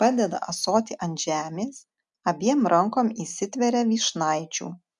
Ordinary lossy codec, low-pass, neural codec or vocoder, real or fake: MP3, 96 kbps; 10.8 kHz; none; real